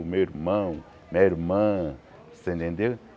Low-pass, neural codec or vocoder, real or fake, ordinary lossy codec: none; none; real; none